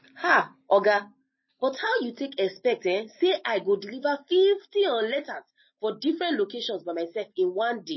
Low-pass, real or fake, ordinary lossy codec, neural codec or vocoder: 7.2 kHz; real; MP3, 24 kbps; none